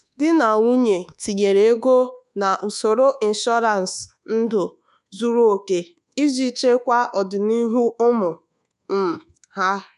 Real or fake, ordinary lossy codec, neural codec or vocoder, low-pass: fake; none; codec, 24 kHz, 1.2 kbps, DualCodec; 10.8 kHz